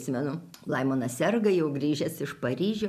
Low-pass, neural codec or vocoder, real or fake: 14.4 kHz; none; real